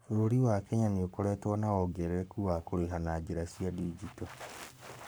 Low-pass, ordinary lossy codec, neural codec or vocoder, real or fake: none; none; codec, 44.1 kHz, 7.8 kbps, Pupu-Codec; fake